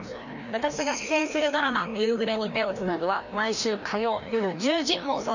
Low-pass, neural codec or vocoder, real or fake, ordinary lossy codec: 7.2 kHz; codec, 16 kHz, 1 kbps, FreqCodec, larger model; fake; none